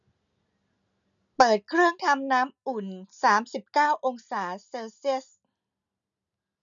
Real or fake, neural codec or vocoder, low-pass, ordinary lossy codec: real; none; 7.2 kHz; none